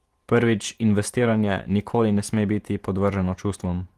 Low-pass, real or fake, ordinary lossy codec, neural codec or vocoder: 14.4 kHz; fake; Opus, 24 kbps; vocoder, 48 kHz, 128 mel bands, Vocos